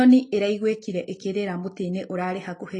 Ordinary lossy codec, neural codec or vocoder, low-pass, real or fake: AAC, 32 kbps; none; 10.8 kHz; real